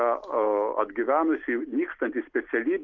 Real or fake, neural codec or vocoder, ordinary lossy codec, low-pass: real; none; Opus, 32 kbps; 7.2 kHz